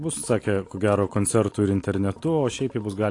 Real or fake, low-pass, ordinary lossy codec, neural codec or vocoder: real; 10.8 kHz; AAC, 48 kbps; none